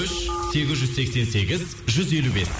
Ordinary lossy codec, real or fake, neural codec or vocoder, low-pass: none; real; none; none